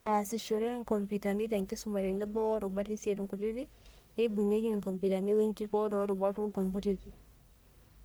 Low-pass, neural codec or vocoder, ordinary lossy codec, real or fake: none; codec, 44.1 kHz, 1.7 kbps, Pupu-Codec; none; fake